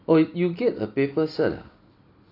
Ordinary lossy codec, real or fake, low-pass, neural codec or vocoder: AAC, 32 kbps; real; 5.4 kHz; none